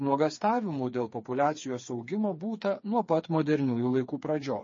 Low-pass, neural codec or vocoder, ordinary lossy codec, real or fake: 7.2 kHz; codec, 16 kHz, 4 kbps, FreqCodec, smaller model; MP3, 32 kbps; fake